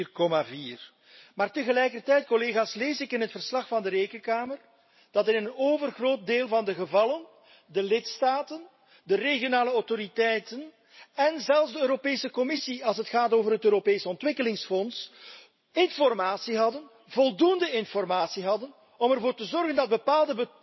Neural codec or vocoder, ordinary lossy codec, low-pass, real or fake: none; MP3, 24 kbps; 7.2 kHz; real